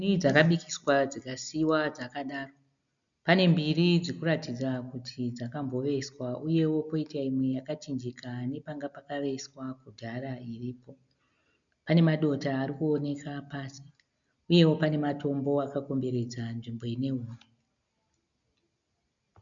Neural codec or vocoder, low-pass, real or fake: none; 7.2 kHz; real